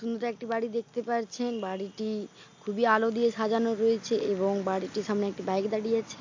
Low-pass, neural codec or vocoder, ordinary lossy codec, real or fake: 7.2 kHz; none; AAC, 48 kbps; real